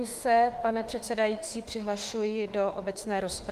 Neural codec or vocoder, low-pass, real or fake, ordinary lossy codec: autoencoder, 48 kHz, 32 numbers a frame, DAC-VAE, trained on Japanese speech; 14.4 kHz; fake; Opus, 24 kbps